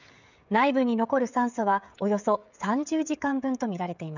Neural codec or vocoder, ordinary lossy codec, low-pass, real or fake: codec, 16 kHz, 16 kbps, FreqCodec, smaller model; none; 7.2 kHz; fake